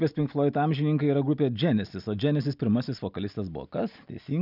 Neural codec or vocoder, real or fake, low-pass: none; real; 5.4 kHz